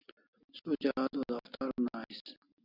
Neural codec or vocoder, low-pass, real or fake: none; 5.4 kHz; real